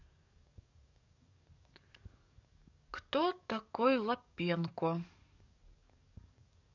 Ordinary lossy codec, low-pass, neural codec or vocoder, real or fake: none; 7.2 kHz; codec, 44.1 kHz, 7.8 kbps, DAC; fake